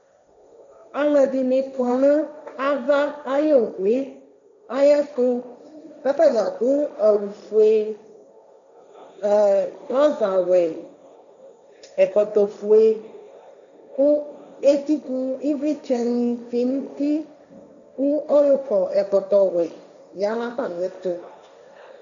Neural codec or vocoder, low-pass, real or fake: codec, 16 kHz, 1.1 kbps, Voila-Tokenizer; 7.2 kHz; fake